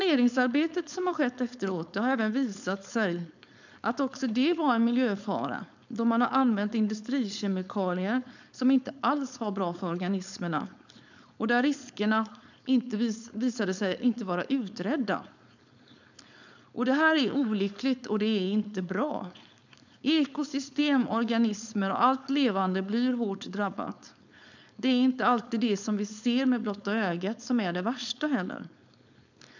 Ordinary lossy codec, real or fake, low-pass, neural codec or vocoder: none; fake; 7.2 kHz; codec, 16 kHz, 4.8 kbps, FACodec